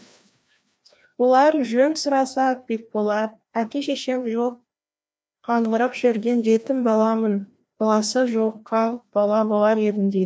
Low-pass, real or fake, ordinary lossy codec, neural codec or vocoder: none; fake; none; codec, 16 kHz, 1 kbps, FreqCodec, larger model